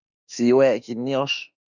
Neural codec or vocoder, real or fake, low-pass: autoencoder, 48 kHz, 32 numbers a frame, DAC-VAE, trained on Japanese speech; fake; 7.2 kHz